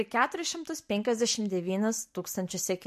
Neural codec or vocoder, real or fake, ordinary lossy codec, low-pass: none; real; MP3, 64 kbps; 14.4 kHz